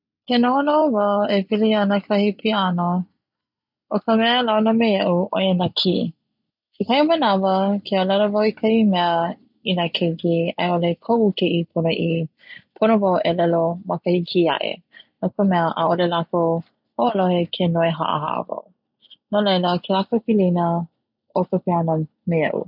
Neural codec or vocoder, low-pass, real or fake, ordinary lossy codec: none; 5.4 kHz; real; none